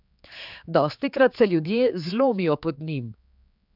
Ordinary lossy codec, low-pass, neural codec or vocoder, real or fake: none; 5.4 kHz; codec, 16 kHz, 4 kbps, X-Codec, HuBERT features, trained on general audio; fake